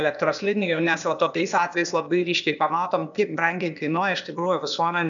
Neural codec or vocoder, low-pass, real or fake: codec, 16 kHz, 0.8 kbps, ZipCodec; 7.2 kHz; fake